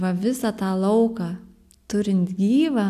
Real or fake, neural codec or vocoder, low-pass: real; none; 14.4 kHz